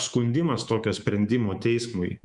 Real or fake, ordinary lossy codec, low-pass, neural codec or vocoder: fake; Opus, 64 kbps; 10.8 kHz; codec, 24 kHz, 3.1 kbps, DualCodec